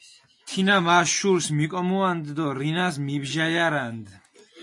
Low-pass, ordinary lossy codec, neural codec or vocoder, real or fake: 10.8 kHz; MP3, 48 kbps; none; real